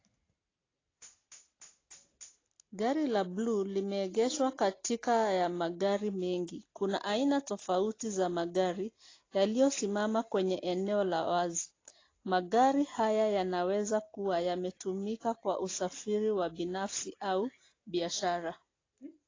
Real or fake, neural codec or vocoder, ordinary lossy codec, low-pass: real; none; AAC, 32 kbps; 7.2 kHz